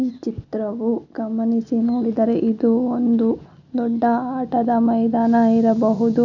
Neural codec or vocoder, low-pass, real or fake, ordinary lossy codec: none; 7.2 kHz; real; none